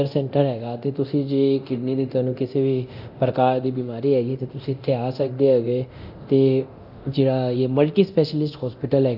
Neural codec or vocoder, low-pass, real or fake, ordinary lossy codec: codec, 24 kHz, 0.9 kbps, DualCodec; 5.4 kHz; fake; none